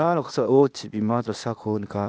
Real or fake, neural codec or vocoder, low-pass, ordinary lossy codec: fake; codec, 16 kHz, 0.8 kbps, ZipCodec; none; none